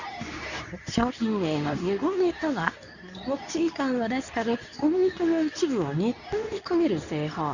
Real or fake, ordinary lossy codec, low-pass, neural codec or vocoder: fake; none; 7.2 kHz; codec, 24 kHz, 0.9 kbps, WavTokenizer, medium speech release version 2